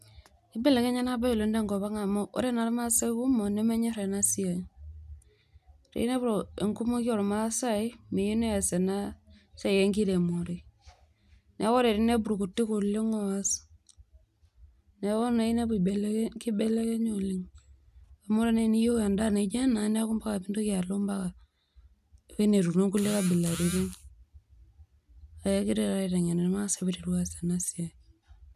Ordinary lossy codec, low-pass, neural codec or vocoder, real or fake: none; 14.4 kHz; none; real